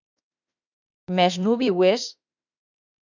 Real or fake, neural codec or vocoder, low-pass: fake; autoencoder, 48 kHz, 32 numbers a frame, DAC-VAE, trained on Japanese speech; 7.2 kHz